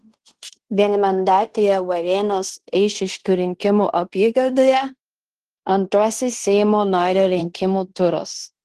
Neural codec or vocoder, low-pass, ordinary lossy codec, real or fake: codec, 16 kHz in and 24 kHz out, 0.9 kbps, LongCat-Audio-Codec, fine tuned four codebook decoder; 10.8 kHz; Opus, 16 kbps; fake